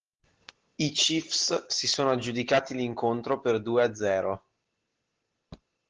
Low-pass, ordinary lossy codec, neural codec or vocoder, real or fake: 7.2 kHz; Opus, 16 kbps; none; real